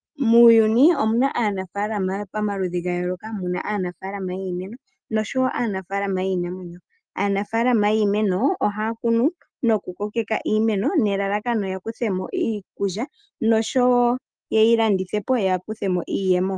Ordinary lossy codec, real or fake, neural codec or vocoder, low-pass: Opus, 32 kbps; real; none; 9.9 kHz